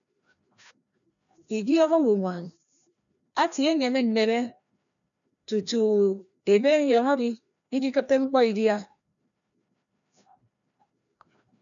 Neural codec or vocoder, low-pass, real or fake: codec, 16 kHz, 1 kbps, FreqCodec, larger model; 7.2 kHz; fake